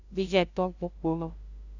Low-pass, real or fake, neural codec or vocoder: 7.2 kHz; fake; codec, 16 kHz, 0.5 kbps, FunCodec, trained on LibriTTS, 25 frames a second